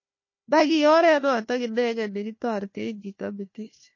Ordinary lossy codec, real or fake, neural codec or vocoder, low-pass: MP3, 32 kbps; fake; codec, 16 kHz, 1 kbps, FunCodec, trained on Chinese and English, 50 frames a second; 7.2 kHz